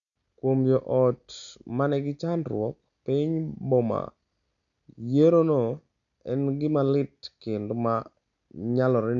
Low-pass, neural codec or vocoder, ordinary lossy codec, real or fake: 7.2 kHz; none; none; real